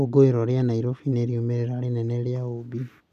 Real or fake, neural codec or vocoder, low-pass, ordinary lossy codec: real; none; 14.4 kHz; none